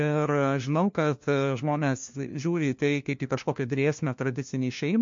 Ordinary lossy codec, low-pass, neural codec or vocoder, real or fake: MP3, 48 kbps; 7.2 kHz; codec, 16 kHz, 1 kbps, FunCodec, trained on LibriTTS, 50 frames a second; fake